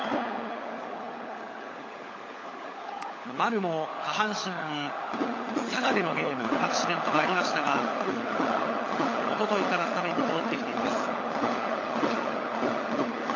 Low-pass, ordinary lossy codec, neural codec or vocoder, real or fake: 7.2 kHz; AAC, 32 kbps; codec, 16 kHz, 16 kbps, FunCodec, trained on LibriTTS, 50 frames a second; fake